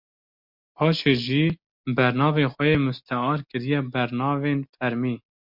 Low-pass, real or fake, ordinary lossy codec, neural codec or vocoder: 5.4 kHz; real; MP3, 48 kbps; none